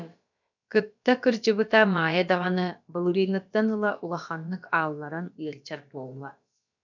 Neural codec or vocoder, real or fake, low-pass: codec, 16 kHz, about 1 kbps, DyCAST, with the encoder's durations; fake; 7.2 kHz